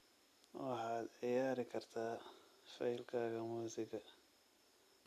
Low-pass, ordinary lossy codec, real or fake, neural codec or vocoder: 14.4 kHz; none; real; none